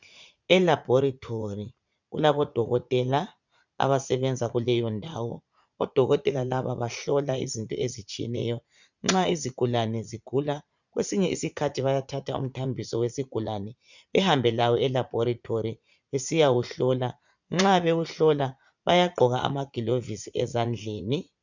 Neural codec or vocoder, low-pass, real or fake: vocoder, 44.1 kHz, 80 mel bands, Vocos; 7.2 kHz; fake